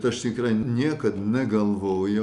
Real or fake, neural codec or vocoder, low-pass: real; none; 10.8 kHz